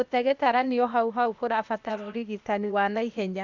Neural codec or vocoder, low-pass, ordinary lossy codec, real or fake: codec, 16 kHz, 0.8 kbps, ZipCodec; 7.2 kHz; Opus, 64 kbps; fake